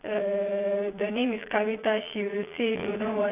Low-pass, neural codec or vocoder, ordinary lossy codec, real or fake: 3.6 kHz; vocoder, 22.05 kHz, 80 mel bands, Vocos; none; fake